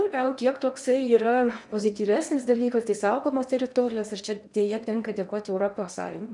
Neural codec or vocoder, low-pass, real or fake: codec, 16 kHz in and 24 kHz out, 0.8 kbps, FocalCodec, streaming, 65536 codes; 10.8 kHz; fake